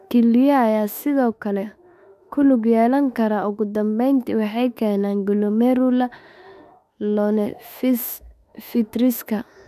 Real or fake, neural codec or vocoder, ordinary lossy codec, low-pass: fake; autoencoder, 48 kHz, 32 numbers a frame, DAC-VAE, trained on Japanese speech; none; 14.4 kHz